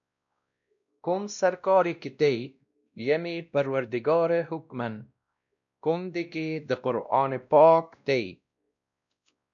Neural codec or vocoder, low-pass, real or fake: codec, 16 kHz, 1 kbps, X-Codec, WavLM features, trained on Multilingual LibriSpeech; 7.2 kHz; fake